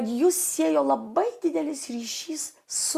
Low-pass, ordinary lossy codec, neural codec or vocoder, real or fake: 14.4 kHz; Opus, 64 kbps; none; real